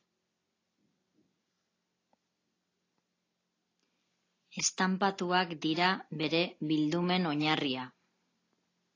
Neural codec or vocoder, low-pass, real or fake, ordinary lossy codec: none; 7.2 kHz; real; AAC, 32 kbps